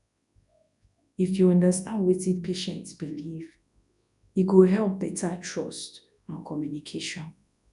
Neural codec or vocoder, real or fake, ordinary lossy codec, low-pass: codec, 24 kHz, 0.9 kbps, WavTokenizer, large speech release; fake; none; 10.8 kHz